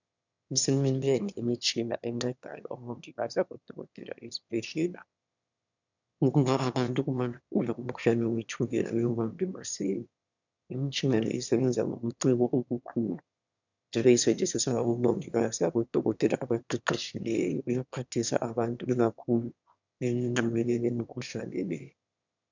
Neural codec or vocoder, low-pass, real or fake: autoencoder, 22.05 kHz, a latent of 192 numbers a frame, VITS, trained on one speaker; 7.2 kHz; fake